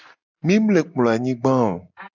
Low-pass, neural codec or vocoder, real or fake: 7.2 kHz; none; real